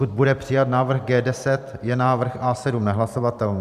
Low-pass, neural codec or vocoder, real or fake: 14.4 kHz; vocoder, 44.1 kHz, 128 mel bands every 512 samples, BigVGAN v2; fake